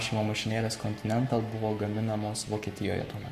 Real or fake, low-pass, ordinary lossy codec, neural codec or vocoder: fake; 14.4 kHz; Opus, 64 kbps; codec, 44.1 kHz, 7.8 kbps, Pupu-Codec